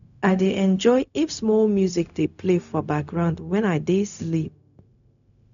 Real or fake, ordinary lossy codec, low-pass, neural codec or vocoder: fake; none; 7.2 kHz; codec, 16 kHz, 0.4 kbps, LongCat-Audio-Codec